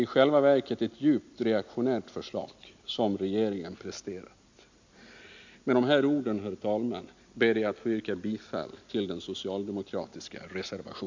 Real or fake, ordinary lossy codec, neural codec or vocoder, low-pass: real; MP3, 64 kbps; none; 7.2 kHz